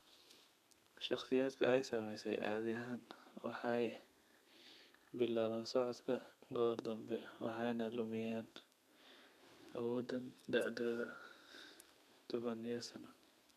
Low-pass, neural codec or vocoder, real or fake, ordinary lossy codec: 14.4 kHz; codec, 32 kHz, 1.9 kbps, SNAC; fake; none